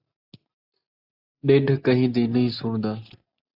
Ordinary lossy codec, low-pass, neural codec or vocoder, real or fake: AAC, 48 kbps; 5.4 kHz; none; real